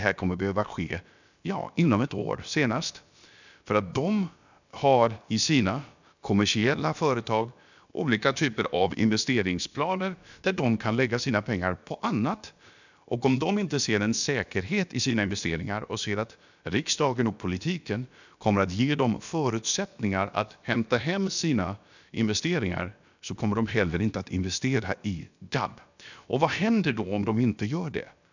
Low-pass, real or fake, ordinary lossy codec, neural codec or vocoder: 7.2 kHz; fake; none; codec, 16 kHz, about 1 kbps, DyCAST, with the encoder's durations